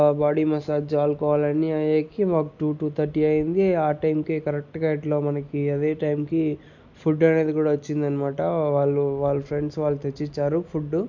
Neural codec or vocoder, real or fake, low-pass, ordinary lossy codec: none; real; 7.2 kHz; none